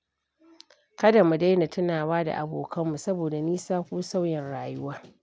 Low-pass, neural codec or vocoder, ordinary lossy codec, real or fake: none; none; none; real